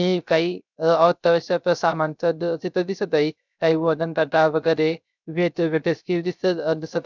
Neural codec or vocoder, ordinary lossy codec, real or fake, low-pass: codec, 16 kHz, 0.3 kbps, FocalCodec; none; fake; 7.2 kHz